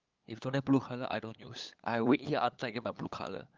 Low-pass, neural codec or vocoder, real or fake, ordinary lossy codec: 7.2 kHz; codec, 16 kHz, 8 kbps, FunCodec, trained on LibriTTS, 25 frames a second; fake; Opus, 24 kbps